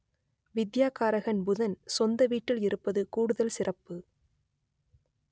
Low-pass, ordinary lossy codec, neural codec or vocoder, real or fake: none; none; none; real